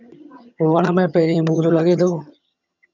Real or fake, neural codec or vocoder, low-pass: fake; vocoder, 22.05 kHz, 80 mel bands, HiFi-GAN; 7.2 kHz